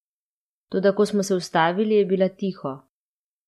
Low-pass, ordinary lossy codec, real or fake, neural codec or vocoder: 14.4 kHz; MP3, 64 kbps; real; none